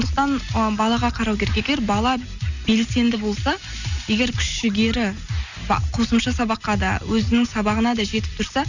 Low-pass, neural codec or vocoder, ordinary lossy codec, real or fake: 7.2 kHz; none; none; real